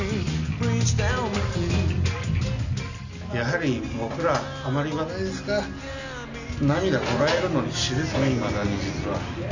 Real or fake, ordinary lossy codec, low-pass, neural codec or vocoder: real; none; 7.2 kHz; none